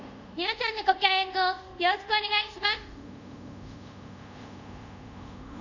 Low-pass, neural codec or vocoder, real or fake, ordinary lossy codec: 7.2 kHz; codec, 24 kHz, 0.5 kbps, DualCodec; fake; none